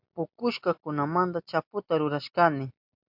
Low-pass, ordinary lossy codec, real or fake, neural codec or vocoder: 5.4 kHz; MP3, 48 kbps; real; none